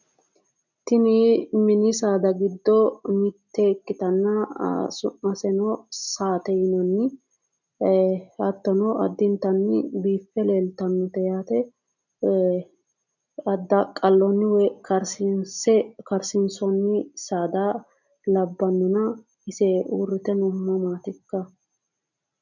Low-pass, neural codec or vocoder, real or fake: 7.2 kHz; none; real